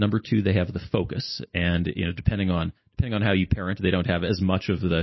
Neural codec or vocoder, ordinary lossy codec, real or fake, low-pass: none; MP3, 24 kbps; real; 7.2 kHz